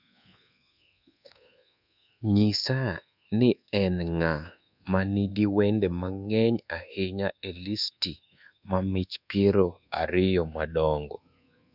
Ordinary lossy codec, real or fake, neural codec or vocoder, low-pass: none; fake; codec, 24 kHz, 1.2 kbps, DualCodec; 5.4 kHz